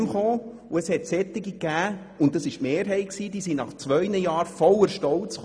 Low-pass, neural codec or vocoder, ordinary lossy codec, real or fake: none; none; none; real